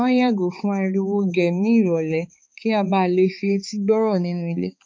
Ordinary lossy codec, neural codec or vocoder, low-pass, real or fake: none; codec, 16 kHz, 4 kbps, X-Codec, HuBERT features, trained on balanced general audio; none; fake